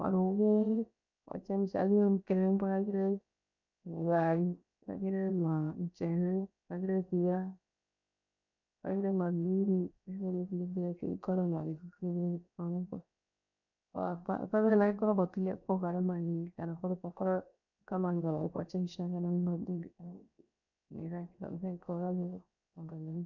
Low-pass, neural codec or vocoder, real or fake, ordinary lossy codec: 7.2 kHz; codec, 16 kHz, 0.7 kbps, FocalCodec; fake; none